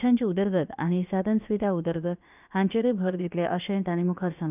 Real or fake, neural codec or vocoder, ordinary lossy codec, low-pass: fake; codec, 16 kHz, about 1 kbps, DyCAST, with the encoder's durations; none; 3.6 kHz